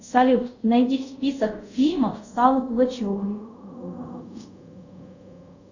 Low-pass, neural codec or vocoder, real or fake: 7.2 kHz; codec, 24 kHz, 0.5 kbps, DualCodec; fake